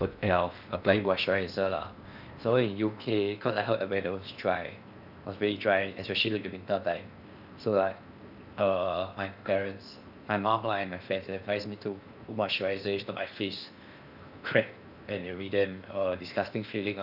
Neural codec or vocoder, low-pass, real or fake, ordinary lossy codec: codec, 16 kHz in and 24 kHz out, 0.8 kbps, FocalCodec, streaming, 65536 codes; 5.4 kHz; fake; none